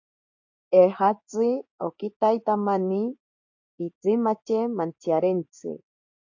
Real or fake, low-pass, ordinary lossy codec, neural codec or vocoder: fake; 7.2 kHz; AAC, 48 kbps; vocoder, 24 kHz, 100 mel bands, Vocos